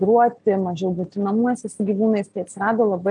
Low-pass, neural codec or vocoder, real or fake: 9.9 kHz; none; real